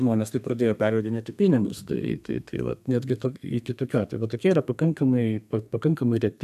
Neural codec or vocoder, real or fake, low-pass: codec, 32 kHz, 1.9 kbps, SNAC; fake; 14.4 kHz